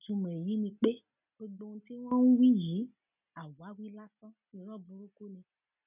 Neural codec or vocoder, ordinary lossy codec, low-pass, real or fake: none; none; 3.6 kHz; real